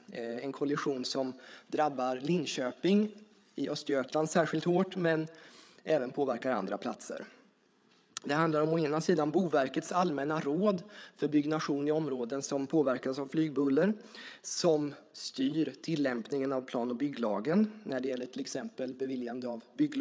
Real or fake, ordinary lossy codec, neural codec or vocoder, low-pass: fake; none; codec, 16 kHz, 8 kbps, FreqCodec, larger model; none